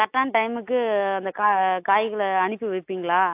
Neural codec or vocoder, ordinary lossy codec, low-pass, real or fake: none; AAC, 32 kbps; 3.6 kHz; real